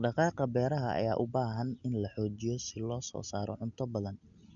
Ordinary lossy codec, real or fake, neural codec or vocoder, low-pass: MP3, 96 kbps; real; none; 7.2 kHz